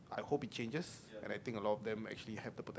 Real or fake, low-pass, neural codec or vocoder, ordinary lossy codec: real; none; none; none